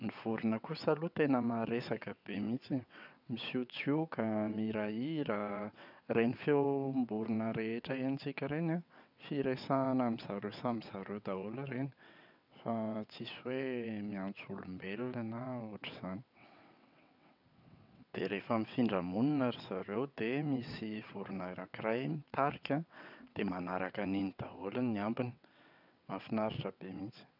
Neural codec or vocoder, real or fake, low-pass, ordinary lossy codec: vocoder, 22.05 kHz, 80 mel bands, WaveNeXt; fake; 5.4 kHz; none